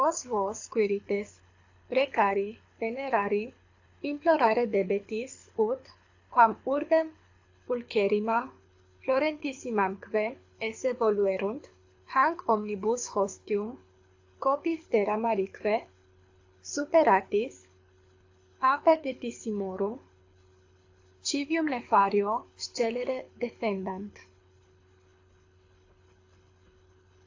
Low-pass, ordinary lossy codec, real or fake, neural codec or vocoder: 7.2 kHz; AAC, 48 kbps; fake; codec, 24 kHz, 6 kbps, HILCodec